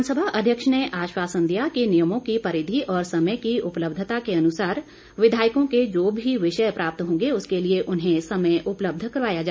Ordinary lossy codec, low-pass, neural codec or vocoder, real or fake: none; none; none; real